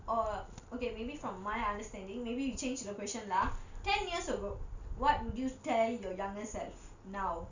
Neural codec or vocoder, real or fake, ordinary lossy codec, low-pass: none; real; none; 7.2 kHz